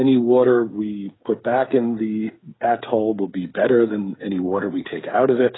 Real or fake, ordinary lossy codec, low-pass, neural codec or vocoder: fake; AAC, 16 kbps; 7.2 kHz; codec, 16 kHz, 8 kbps, FreqCodec, smaller model